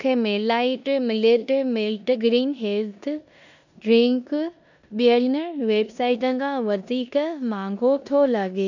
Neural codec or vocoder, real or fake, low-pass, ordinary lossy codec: codec, 16 kHz in and 24 kHz out, 0.9 kbps, LongCat-Audio-Codec, four codebook decoder; fake; 7.2 kHz; none